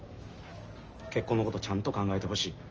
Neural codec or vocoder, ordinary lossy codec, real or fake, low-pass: none; Opus, 24 kbps; real; 7.2 kHz